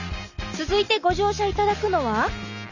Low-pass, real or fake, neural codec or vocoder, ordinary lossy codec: 7.2 kHz; real; none; none